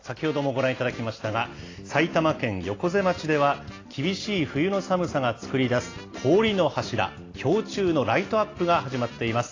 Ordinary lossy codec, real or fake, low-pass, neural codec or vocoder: AAC, 32 kbps; real; 7.2 kHz; none